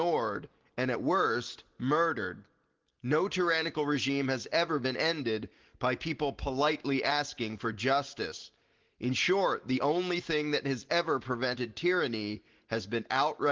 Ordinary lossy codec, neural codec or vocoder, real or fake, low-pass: Opus, 16 kbps; none; real; 7.2 kHz